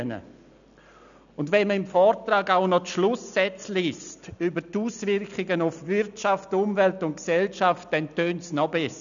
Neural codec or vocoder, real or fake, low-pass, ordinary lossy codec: none; real; 7.2 kHz; none